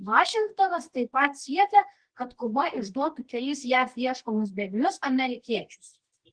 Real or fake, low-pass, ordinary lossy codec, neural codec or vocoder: fake; 9.9 kHz; Opus, 16 kbps; codec, 24 kHz, 0.9 kbps, WavTokenizer, medium music audio release